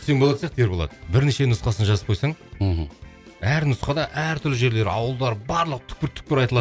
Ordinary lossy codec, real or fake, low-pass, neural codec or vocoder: none; real; none; none